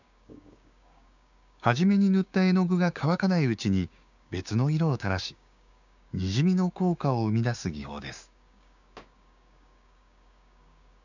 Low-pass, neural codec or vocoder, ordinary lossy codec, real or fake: 7.2 kHz; codec, 16 kHz, 6 kbps, DAC; none; fake